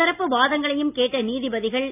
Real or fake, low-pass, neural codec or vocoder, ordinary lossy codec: real; 3.6 kHz; none; none